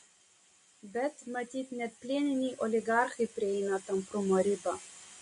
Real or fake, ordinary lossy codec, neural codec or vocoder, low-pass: real; MP3, 48 kbps; none; 10.8 kHz